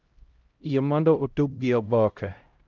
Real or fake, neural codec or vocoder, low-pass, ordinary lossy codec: fake; codec, 16 kHz, 0.5 kbps, X-Codec, HuBERT features, trained on LibriSpeech; 7.2 kHz; Opus, 24 kbps